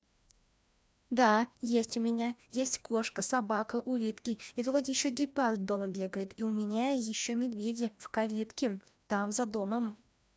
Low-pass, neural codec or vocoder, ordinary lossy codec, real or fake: none; codec, 16 kHz, 1 kbps, FreqCodec, larger model; none; fake